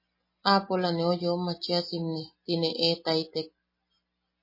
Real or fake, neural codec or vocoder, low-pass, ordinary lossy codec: real; none; 5.4 kHz; MP3, 24 kbps